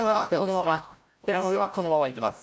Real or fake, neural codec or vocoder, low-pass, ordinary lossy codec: fake; codec, 16 kHz, 0.5 kbps, FreqCodec, larger model; none; none